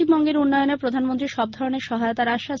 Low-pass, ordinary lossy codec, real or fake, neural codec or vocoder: 7.2 kHz; Opus, 32 kbps; real; none